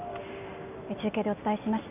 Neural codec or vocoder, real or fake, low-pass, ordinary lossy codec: none; real; 3.6 kHz; none